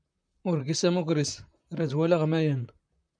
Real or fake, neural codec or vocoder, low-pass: fake; vocoder, 44.1 kHz, 128 mel bands, Pupu-Vocoder; 9.9 kHz